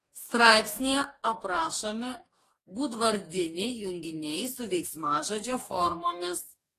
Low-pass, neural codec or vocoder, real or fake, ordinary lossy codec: 14.4 kHz; codec, 44.1 kHz, 2.6 kbps, DAC; fake; AAC, 48 kbps